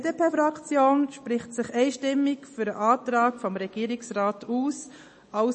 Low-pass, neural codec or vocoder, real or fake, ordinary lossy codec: 10.8 kHz; none; real; MP3, 32 kbps